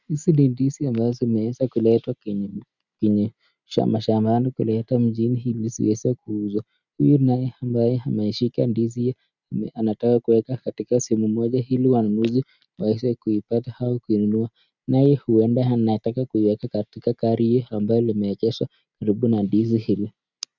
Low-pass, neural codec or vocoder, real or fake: 7.2 kHz; none; real